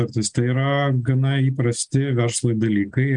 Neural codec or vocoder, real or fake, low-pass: none; real; 9.9 kHz